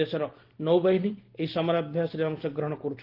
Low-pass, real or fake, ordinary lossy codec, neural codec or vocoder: 5.4 kHz; real; Opus, 16 kbps; none